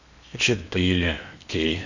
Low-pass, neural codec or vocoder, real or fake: 7.2 kHz; codec, 16 kHz in and 24 kHz out, 0.8 kbps, FocalCodec, streaming, 65536 codes; fake